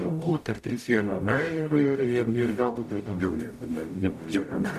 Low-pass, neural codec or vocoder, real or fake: 14.4 kHz; codec, 44.1 kHz, 0.9 kbps, DAC; fake